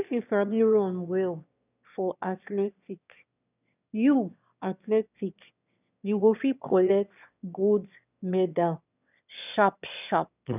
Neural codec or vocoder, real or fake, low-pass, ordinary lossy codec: autoencoder, 22.05 kHz, a latent of 192 numbers a frame, VITS, trained on one speaker; fake; 3.6 kHz; none